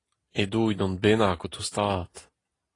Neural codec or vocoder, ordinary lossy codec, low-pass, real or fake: none; AAC, 32 kbps; 10.8 kHz; real